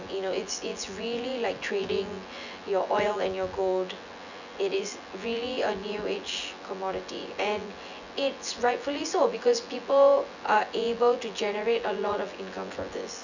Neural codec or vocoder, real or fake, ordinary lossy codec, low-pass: vocoder, 24 kHz, 100 mel bands, Vocos; fake; none; 7.2 kHz